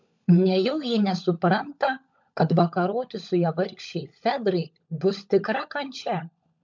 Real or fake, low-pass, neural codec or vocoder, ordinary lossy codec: fake; 7.2 kHz; codec, 16 kHz, 16 kbps, FunCodec, trained on LibriTTS, 50 frames a second; MP3, 64 kbps